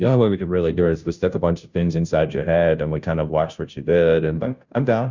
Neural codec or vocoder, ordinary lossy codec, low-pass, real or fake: codec, 16 kHz, 0.5 kbps, FunCodec, trained on Chinese and English, 25 frames a second; Opus, 64 kbps; 7.2 kHz; fake